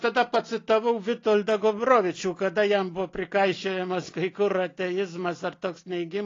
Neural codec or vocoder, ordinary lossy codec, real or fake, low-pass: none; AAC, 32 kbps; real; 7.2 kHz